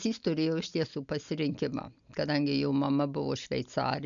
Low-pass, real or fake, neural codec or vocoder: 7.2 kHz; real; none